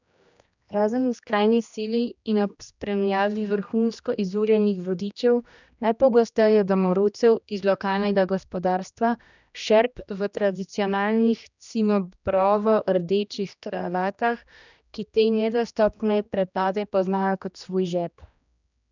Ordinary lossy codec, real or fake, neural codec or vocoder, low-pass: none; fake; codec, 16 kHz, 1 kbps, X-Codec, HuBERT features, trained on general audio; 7.2 kHz